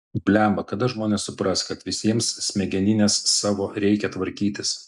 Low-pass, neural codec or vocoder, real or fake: 10.8 kHz; none; real